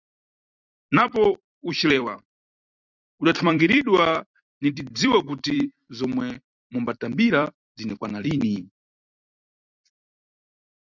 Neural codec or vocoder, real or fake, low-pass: vocoder, 44.1 kHz, 128 mel bands every 256 samples, BigVGAN v2; fake; 7.2 kHz